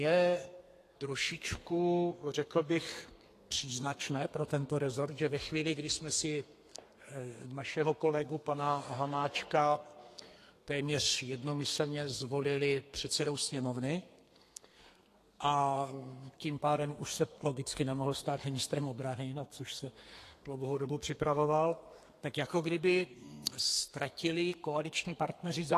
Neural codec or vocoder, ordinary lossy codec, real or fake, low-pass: codec, 32 kHz, 1.9 kbps, SNAC; AAC, 48 kbps; fake; 14.4 kHz